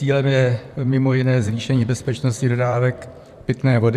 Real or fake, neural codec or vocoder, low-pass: fake; vocoder, 44.1 kHz, 128 mel bands, Pupu-Vocoder; 14.4 kHz